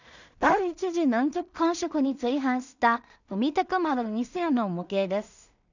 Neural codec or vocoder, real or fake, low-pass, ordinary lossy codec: codec, 16 kHz in and 24 kHz out, 0.4 kbps, LongCat-Audio-Codec, two codebook decoder; fake; 7.2 kHz; none